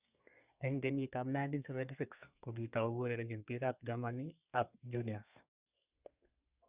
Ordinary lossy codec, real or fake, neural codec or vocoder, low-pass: Opus, 64 kbps; fake; codec, 32 kHz, 1.9 kbps, SNAC; 3.6 kHz